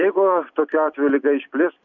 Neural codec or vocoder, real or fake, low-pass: vocoder, 44.1 kHz, 128 mel bands every 256 samples, BigVGAN v2; fake; 7.2 kHz